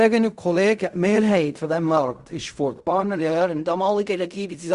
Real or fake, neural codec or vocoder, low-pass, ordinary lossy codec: fake; codec, 16 kHz in and 24 kHz out, 0.4 kbps, LongCat-Audio-Codec, fine tuned four codebook decoder; 10.8 kHz; Opus, 64 kbps